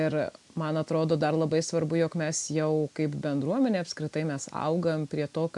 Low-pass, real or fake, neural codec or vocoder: 10.8 kHz; real; none